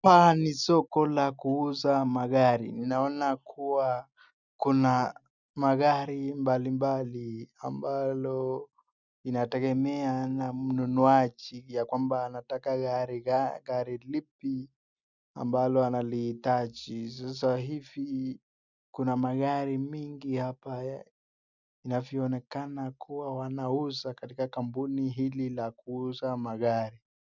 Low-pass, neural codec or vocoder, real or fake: 7.2 kHz; none; real